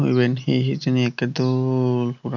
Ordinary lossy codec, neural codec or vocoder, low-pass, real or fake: none; none; 7.2 kHz; real